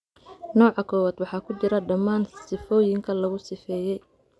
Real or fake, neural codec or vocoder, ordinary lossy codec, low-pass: real; none; none; none